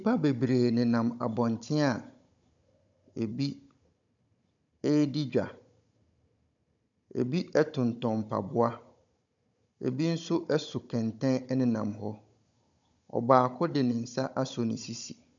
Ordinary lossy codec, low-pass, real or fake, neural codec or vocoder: AAC, 64 kbps; 7.2 kHz; fake; codec, 16 kHz, 16 kbps, FunCodec, trained on Chinese and English, 50 frames a second